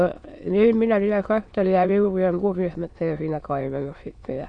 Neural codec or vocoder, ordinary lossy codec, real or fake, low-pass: autoencoder, 22.05 kHz, a latent of 192 numbers a frame, VITS, trained on many speakers; MP3, 48 kbps; fake; 9.9 kHz